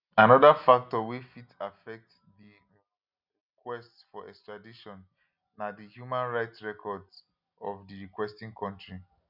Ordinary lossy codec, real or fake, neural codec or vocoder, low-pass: none; real; none; 5.4 kHz